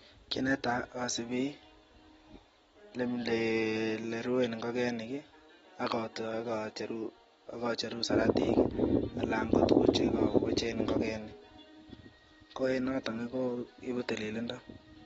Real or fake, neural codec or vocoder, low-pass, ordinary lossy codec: real; none; 19.8 kHz; AAC, 24 kbps